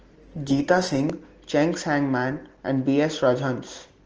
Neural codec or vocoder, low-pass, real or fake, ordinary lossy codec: none; 7.2 kHz; real; Opus, 16 kbps